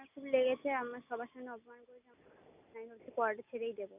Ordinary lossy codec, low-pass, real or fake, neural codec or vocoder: none; 3.6 kHz; real; none